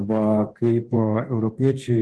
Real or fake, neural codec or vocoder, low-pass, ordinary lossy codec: fake; codec, 24 kHz, 0.9 kbps, DualCodec; 10.8 kHz; Opus, 16 kbps